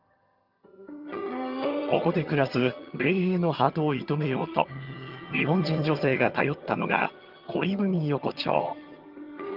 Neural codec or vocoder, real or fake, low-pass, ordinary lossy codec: vocoder, 22.05 kHz, 80 mel bands, HiFi-GAN; fake; 5.4 kHz; Opus, 32 kbps